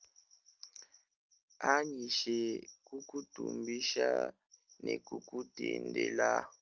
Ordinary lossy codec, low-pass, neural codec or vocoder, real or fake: Opus, 24 kbps; 7.2 kHz; none; real